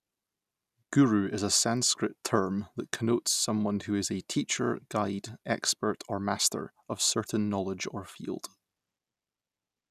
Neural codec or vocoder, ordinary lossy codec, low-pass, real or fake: none; none; 14.4 kHz; real